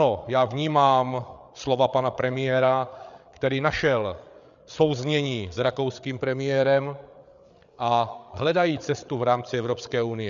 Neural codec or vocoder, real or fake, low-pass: codec, 16 kHz, 8 kbps, FreqCodec, larger model; fake; 7.2 kHz